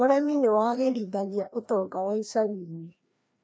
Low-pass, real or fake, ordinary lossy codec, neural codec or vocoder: none; fake; none; codec, 16 kHz, 1 kbps, FreqCodec, larger model